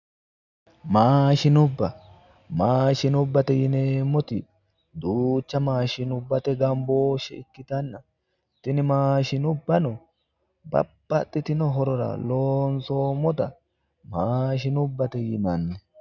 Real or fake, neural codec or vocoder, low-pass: real; none; 7.2 kHz